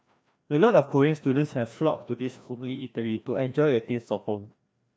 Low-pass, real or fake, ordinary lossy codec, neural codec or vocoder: none; fake; none; codec, 16 kHz, 1 kbps, FreqCodec, larger model